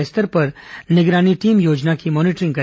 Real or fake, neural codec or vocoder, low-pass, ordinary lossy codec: real; none; none; none